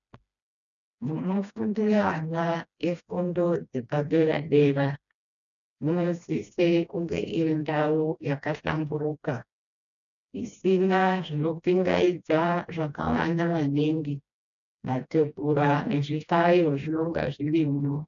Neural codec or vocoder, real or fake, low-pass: codec, 16 kHz, 1 kbps, FreqCodec, smaller model; fake; 7.2 kHz